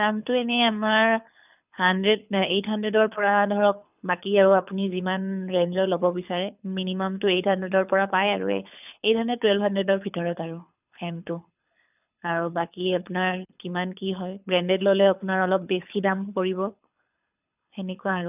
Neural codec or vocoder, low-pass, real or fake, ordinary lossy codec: codec, 24 kHz, 6 kbps, HILCodec; 3.6 kHz; fake; none